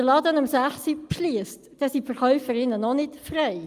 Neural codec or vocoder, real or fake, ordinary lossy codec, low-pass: none; real; Opus, 32 kbps; 14.4 kHz